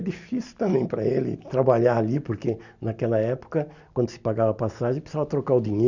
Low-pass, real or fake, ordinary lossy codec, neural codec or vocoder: 7.2 kHz; real; none; none